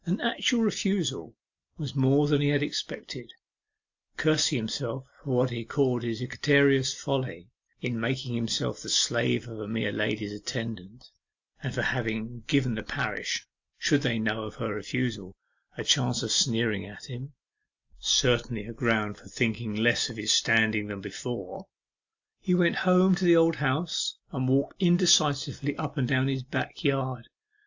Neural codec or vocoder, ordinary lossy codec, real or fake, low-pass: none; AAC, 48 kbps; real; 7.2 kHz